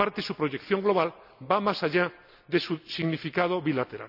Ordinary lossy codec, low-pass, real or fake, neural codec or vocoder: none; 5.4 kHz; real; none